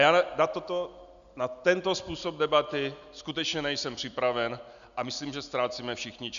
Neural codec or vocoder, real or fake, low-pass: none; real; 7.2 kHz